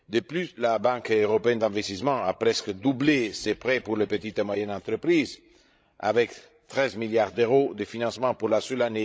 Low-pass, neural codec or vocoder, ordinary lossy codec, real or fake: none; codec, 16 kHz, 16 kbps, FreqCodec, larger model; none; fake